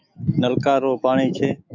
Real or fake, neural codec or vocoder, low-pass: fake; autoencoder, 48 kHz, 128 numbers a frame, DAC-VAE, trained on Japanese speech; 7.2 kHz